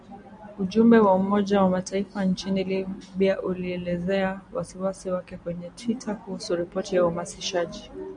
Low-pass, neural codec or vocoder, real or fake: 9.9 kHz; none; real